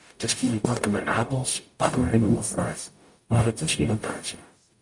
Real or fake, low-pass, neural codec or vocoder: fake; 10.8 kHz; codec, 44.1 kHz, 0.9 kbps, DAC